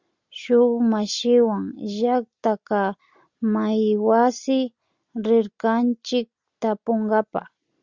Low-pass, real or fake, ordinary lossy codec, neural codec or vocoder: 7.2 kHz; real; Opus, 64 kbps; none